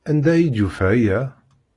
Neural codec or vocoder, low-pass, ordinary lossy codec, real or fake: none; 10.8 kHz; AAC, 32 kbps; real